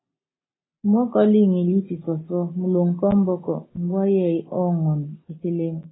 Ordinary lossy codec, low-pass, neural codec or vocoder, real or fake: AAC, 16 kbps; 7.2 kHz; none; real